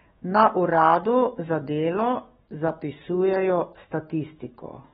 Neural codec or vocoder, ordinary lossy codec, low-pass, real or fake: autoencoder, 48 kHz, 128 numbers a frame, DAC-VAE, trained on Japanese speech; AAC, 16 kbps; 19.8 kHz; fake